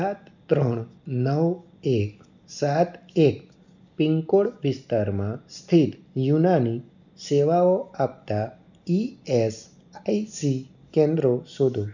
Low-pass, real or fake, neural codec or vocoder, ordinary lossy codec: 7.2 kHz; real; none; none